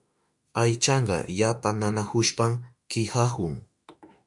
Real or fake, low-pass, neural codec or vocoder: fake; 10.8 kHz; autoencoder, 48 kHz, 32 numbers a frame, DAC-VAE, trained on Japanese speech